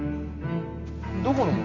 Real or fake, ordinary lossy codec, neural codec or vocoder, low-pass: real; none; none; 7.2 kHz